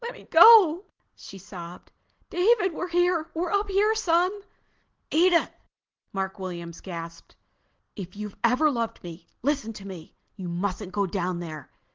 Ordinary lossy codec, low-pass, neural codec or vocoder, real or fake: Opus, 16 kbps; 7.2 kHz; none; real